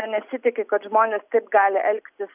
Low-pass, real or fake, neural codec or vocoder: 3.6 kHz; real; none